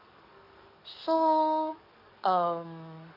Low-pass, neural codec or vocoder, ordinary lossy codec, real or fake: 5.4 kHz; codec, 44.1 kHz, 7.8 kbps, DAC; none; fake